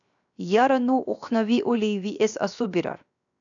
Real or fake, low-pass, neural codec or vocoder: fake; 7.2 kHz; codec, 16 kHz, 0.7 kbps, FocalCodec